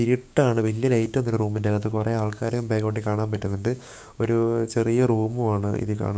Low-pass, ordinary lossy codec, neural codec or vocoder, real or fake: none; none; codec, 16 kHz, 6 kbps, DAC; fake